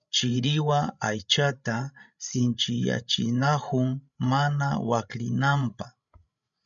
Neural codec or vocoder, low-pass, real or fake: codec, 16 kHz, 16 kbps, FreqCodec, larger model; 7.2 kHz; fake